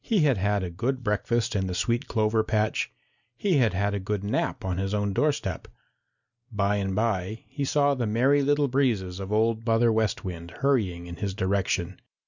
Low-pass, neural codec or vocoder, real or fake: 7.2 kHz; none; real